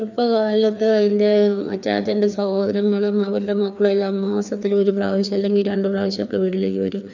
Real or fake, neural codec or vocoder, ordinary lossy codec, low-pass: fake; codec, 16 kHz, 2 kbps, FreqCodec, larger model; none; 7.2 kHz